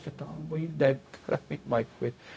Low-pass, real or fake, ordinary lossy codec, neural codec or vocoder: none; fake; none; codec, 16 kHz, 0.4 kbps, LongCat-Audio-Codec